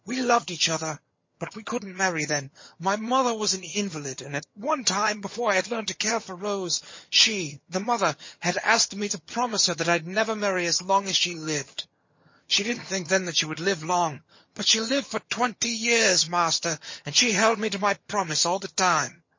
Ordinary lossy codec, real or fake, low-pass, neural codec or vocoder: MP3, 32 kbps; fake; 7.2 kHz; vocoder, 22.05 kHz, 80 mel bands, HiFi-GAN